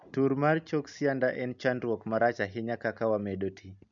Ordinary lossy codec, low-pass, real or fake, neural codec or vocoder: none; 7.2 kHz; real; none